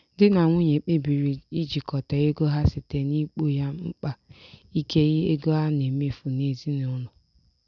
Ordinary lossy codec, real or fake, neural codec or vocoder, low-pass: none; real; none; 7.2 kHz